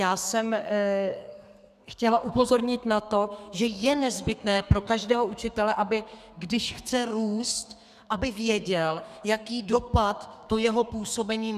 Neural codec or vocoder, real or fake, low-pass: codec, 32 kHz, 1.9 kbps, SNAC; fake; 14.4 kHz